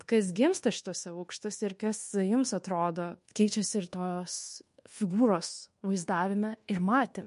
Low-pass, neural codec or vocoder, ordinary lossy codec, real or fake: 10.8 kHz; codec, 24 kHz, 1.2 kbps, DualCodec; MP3, 48 kbps; fake